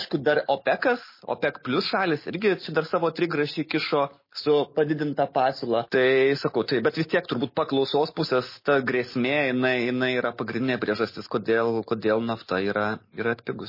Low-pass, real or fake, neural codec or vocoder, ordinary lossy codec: 5.4 kHz; real; none; MP3, 24 kbps